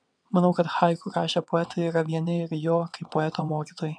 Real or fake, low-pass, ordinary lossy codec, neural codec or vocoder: fake; 9.9 kHz; MP3, 96 kbps; vocoder, 22.05 kHz, 80 mel bands, WaveNeXt